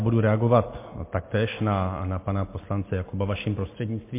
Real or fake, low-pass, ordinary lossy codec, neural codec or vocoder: real; 3.6 kHz; MP3, 24 kbps; none